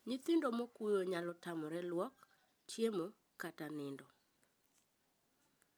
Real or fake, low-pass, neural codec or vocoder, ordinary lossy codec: real; none; none; none